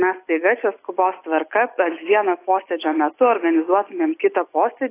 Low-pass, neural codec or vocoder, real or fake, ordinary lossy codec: 3.6 kHz; none; real; AAC, 24 kbps